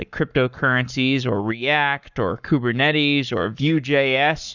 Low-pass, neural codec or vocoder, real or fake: 7.2 kHz; codec, 44.1 kHz, 7.8 kbps, Pupu-Codec; fake